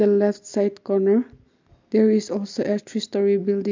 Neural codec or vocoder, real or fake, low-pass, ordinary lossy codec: none; real; 7.2 kHz; MP3, 64 kbps